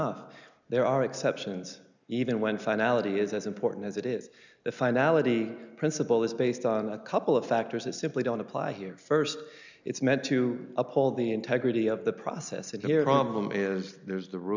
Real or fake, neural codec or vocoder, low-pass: real; none; 7.2 kHz